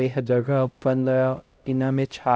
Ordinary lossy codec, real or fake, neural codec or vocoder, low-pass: none; fake; codec, 16 kHz, 0.5 kbps, X-Codec, HuBERT features, trained on LibriSpeech; none